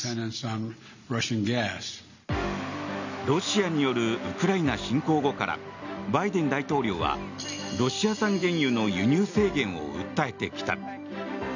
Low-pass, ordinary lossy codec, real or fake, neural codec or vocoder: 7.2 kHz; none; real; none